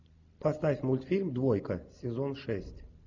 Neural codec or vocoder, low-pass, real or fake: none; 7.2 kHz; real